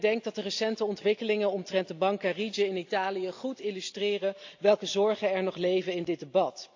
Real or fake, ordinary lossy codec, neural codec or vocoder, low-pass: real; AAC, 48 kbps; none; 7.2 kHz